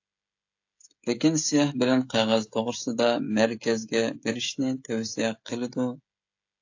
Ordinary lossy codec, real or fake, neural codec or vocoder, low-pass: AAC, 48 kbps; fake; codec, 16 kHz, 16 kbps, FreqCodec, smaller model; 7.2 kHz